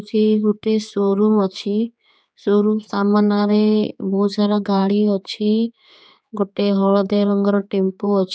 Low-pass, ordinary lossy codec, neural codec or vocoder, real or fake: none; none; codec, 16 kHz, 4 kbps, X-Codec, HuBERT features, trained on general audio; fake